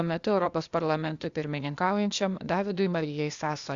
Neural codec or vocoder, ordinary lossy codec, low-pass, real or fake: codec, 16 kHz, 0.8 kbps, ZipCodec; Opus, 64 kbps; 7.2 kHz; fake